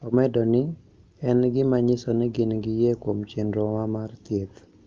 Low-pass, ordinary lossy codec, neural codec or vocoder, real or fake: 7.2 kHz; Opus, 32 kbps; none; real